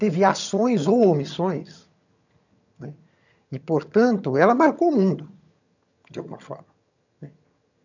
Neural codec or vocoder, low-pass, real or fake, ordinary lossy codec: vocoder, 22.05 kHz, 80 mel bands, HiFi-GAN; 7.2 kHz; fake; none